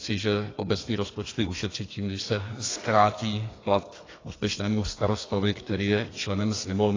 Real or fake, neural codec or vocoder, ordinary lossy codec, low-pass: fake; codec, 32 kHz, 1.9 kbps, SNAC; AAC, 32 kbps; 7.2 kHz